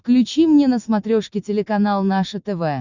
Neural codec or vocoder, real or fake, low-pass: none; real; 7.2 kHz